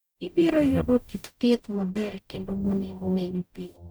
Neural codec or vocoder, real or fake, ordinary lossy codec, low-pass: codec, 44.1 kHz, 0.9 kbps, DAC; fake; none; none